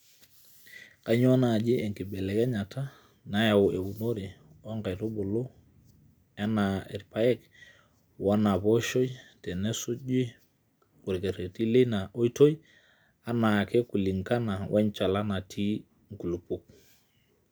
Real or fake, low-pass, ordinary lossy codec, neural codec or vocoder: real; none; none; none